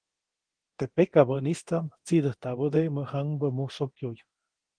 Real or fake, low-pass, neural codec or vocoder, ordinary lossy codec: fake; 9.9 kHz; codec, 24 kHz, 0.9 kbps, DualCodec; Opus, 16 kbps